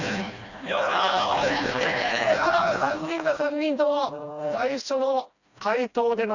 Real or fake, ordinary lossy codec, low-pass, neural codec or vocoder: fake; none; 7.2 kHz; codec, 16 kHz, 1 kbps, FreqCodec, smaller model